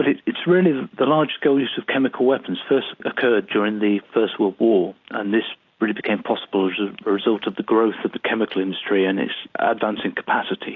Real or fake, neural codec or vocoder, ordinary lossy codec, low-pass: real; none; AAC, 48 kbps; 7.2 kHz